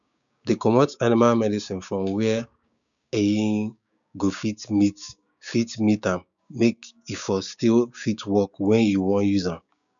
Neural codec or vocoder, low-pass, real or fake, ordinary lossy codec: codec, 16 kHz, 6 kbps, DAC; 7.2 kHz; fake; none